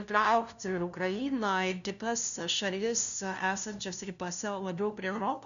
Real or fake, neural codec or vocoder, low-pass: fake; codec, 16 kHz, 0.5 kbps, FunCodec, trained on LibriTTS, 25 frames a second; 7.2 kHz